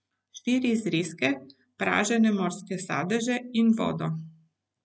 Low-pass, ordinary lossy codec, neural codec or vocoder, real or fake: none; none; none; real